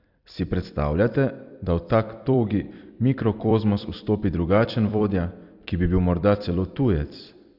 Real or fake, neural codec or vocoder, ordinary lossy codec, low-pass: fake; vocoder, 44.1 kHz, 128 mel bands every 256 samples, BigVGAN v2; Opus, 64 kbps; 5.4 kHz